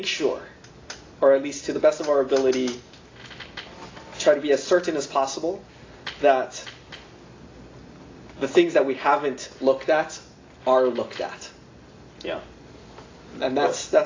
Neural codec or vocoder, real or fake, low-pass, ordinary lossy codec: none; real; 7.2 kHz; AAC, 32 kbps